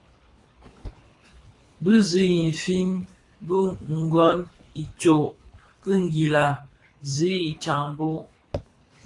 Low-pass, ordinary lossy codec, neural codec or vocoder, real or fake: 10.8 kHz; AAC, 48 kbps; codec, 24 kHz, 3 kbps, HILCodec; fake